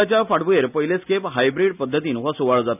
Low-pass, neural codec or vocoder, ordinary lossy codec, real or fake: 3.6 kHz; none; none; real